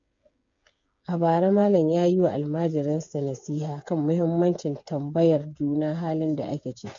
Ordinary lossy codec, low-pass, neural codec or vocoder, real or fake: MP3, 48 kbps; 7.2 kHz; codec, 16 kHz, 8 kbps, FreqCodec, smaller model; fake